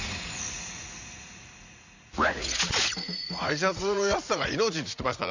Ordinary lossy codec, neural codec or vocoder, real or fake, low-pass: Opus, 64 kbps; vocoder, 44.1 kHz, 80 mel bands, Vocos; fake; 7.2 kHz